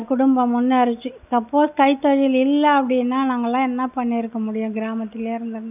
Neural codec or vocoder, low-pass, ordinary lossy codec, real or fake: none; 3.6 kHz; none; real